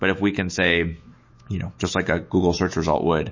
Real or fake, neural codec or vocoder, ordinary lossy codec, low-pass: fake; autoencoder, 48 kHz, 128 numbers a frame, DAC-VAE, trained on Japanese speech; MP3, 32 kbps; 7.2 kHz